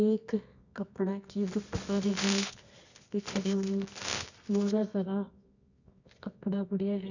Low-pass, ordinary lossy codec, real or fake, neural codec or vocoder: 7.2 kHz; none; fake; codec, 24 kHz, 0.9 kbps, WavTokenizer, medium music audio release